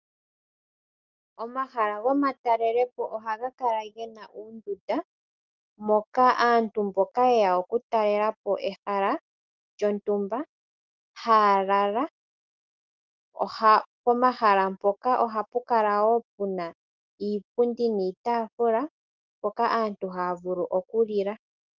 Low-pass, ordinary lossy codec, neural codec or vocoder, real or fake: 7.2 kHz; Opus, 24 kbps; none; real